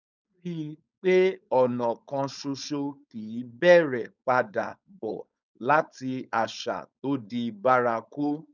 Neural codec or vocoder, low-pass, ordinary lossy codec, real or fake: codec, 16 kHz, 4.8 kbps, FACodec; 7.2 kHz; none; fake